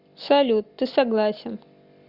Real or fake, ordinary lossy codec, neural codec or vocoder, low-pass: real; Opus, 64 kbps; none; 5.4 kHz